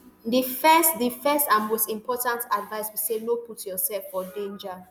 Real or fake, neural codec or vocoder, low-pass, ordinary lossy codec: real; none; none; none